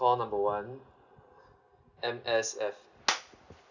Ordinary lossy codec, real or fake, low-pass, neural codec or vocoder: none; real; 7.2 kHz; none